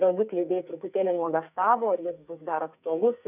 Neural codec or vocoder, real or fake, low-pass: codec, 44.1 kHz, 2.6 kbps, SNAC; fake; 3.6 kHz